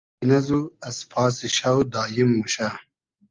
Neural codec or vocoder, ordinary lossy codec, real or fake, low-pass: none; Opus, 32 kbps; real; 7.2 kHz